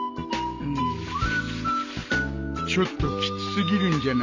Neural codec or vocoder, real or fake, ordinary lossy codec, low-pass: none; real; MP3, 64 kbps; 7.2 kHz